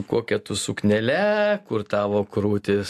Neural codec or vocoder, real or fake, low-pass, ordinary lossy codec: none; real; 14.4 kHz; AAC, 64 kbps